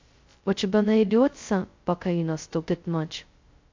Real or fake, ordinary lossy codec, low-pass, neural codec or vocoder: fake; MP3, 48 kbps; 7.2 kHz; codec, 16 kHz, 0.2 kbps, FocalCodec